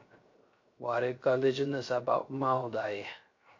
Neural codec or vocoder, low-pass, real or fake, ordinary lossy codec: codec, 16 kHz, 0.3 kbps, FocalCodec; 7.2 kHz; fake; MP3, 48 kbps